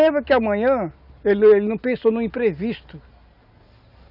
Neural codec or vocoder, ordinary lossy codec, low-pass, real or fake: none; none; 5.4 kHz; real